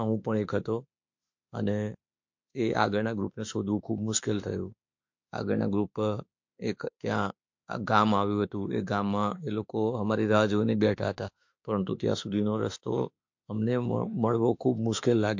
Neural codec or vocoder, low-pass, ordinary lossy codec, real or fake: autoencoder, 48 kHz, 32 numbers a frame, DAC-VAE, trained on Japanese speech; 7.2 kHz; MP3, 48 kbps; fake